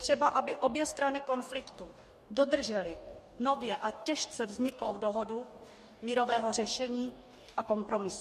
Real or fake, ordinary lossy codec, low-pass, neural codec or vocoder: fake; MP3, 64 kbps; 14.4 kHz; codec, 44.1 kHz, 2.6 kbps, DAC